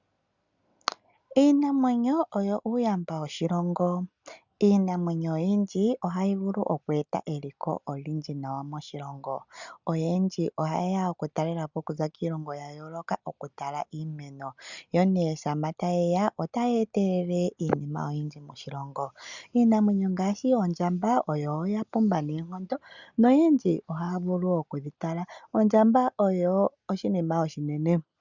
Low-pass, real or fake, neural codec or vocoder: 7.2 kHz; real; none